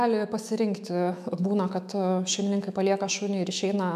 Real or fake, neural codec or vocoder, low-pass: fake; autoencoder, 48 kHz, 128 numbers a frame, DAC-VAE, trained on Japanese speech; 14.4 kHz